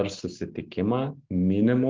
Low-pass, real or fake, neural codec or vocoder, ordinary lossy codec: 7.2 kHz; real; none; Opus, 16 kbps